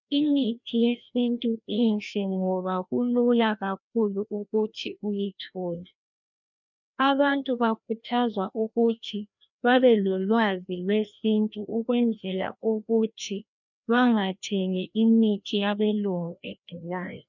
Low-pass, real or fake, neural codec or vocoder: 7.2 kHz; fake; codec, 16 kHz, 1 kbps, FreqCodec, larger model